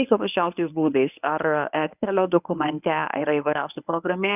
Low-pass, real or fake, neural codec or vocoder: 3.6 kHz; fake; codec, 24 kHz, 0.9 kbps, WavTokenizer, medium speech release version 1